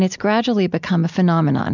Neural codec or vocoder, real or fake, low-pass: none; real; 7.2 kHz